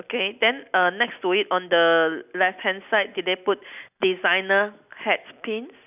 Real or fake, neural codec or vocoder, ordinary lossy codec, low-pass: real; none; none; 3.6 kHz